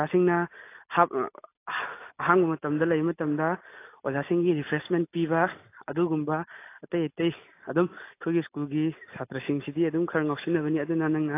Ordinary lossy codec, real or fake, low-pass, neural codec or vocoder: AAC, 24 kbps; real; 3.6 kHz; none